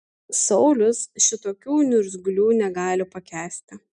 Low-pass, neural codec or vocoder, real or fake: 9.9 kHz; none; real